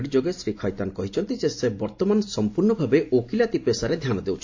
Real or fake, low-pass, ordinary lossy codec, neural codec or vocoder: real; 7.2 kHz; AAC, 48 kbps; none